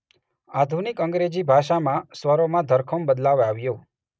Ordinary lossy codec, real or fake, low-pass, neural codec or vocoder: none; real; none; none